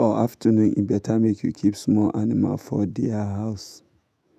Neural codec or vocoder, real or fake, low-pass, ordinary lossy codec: autoencoder, 48 kHz, 128 numbers a frame, DAC-VAE, trained on Japanese speech; fake; 14.4 kHz; none